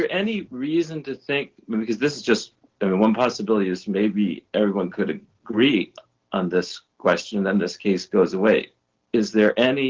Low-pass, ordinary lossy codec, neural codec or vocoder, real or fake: 7.2 kHz; Opus, 16 kbps; none; real